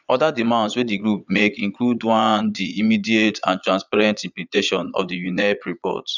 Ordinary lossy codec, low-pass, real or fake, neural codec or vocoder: none; 7.2 kHz; fake; vocoder, 22.05 kHz, 80 mel bands, Vocos